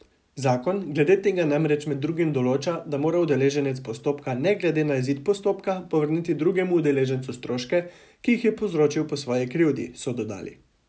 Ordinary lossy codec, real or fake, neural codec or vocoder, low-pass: none; real; none; none